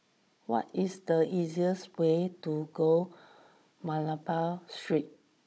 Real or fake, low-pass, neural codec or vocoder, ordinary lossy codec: fake; none; codec, 16 kHz, 16 kbps, FunCodec, trained on Chinese and English, 50 frames a second; none